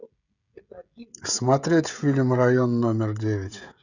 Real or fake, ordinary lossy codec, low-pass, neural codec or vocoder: fake; none; 7.2 kHz; codec, 16 kHz, 16 kbps, FreqCodec, smaller model